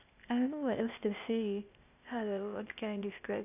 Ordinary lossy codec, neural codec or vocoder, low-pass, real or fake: none; codec, 16 kHz, 0.8 kbps, ZipCodec; 3.6 kHz; fake